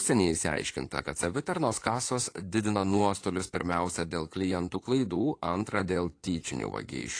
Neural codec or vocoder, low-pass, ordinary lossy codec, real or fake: codec, 16 kHz in and 24 kHz out, 2.2 kbps, FireRedTTS-2 codec; 9.9 kHz; AAC, 48 kbps; fake